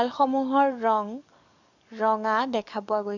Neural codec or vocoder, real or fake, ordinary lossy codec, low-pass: codec, 44.1 kHz, 7.8 kbps, DAC; fake; none; 7.2 kHz